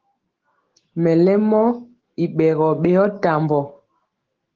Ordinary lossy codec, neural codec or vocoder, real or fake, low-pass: Opus, 16 kbps; autoencoder, 48 kHz, 128 numbers a frame, DAC-VAE, trained on Japanese speech; fake; 7.2 kHz